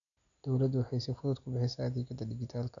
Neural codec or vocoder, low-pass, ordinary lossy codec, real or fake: none; 7.2 kHz; none; real